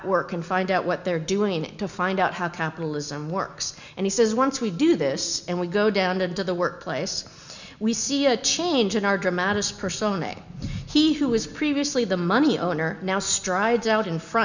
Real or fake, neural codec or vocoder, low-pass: real; none; 7.2 kHz